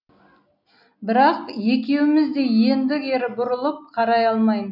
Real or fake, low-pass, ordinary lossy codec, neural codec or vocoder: real; 5.4 kHz; none; none